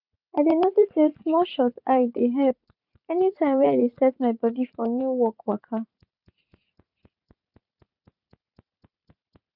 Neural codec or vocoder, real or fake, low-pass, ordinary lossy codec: vocoder, 44.1 kHz, 80 mel bands, Vocos; fake; 5.4 kHz; none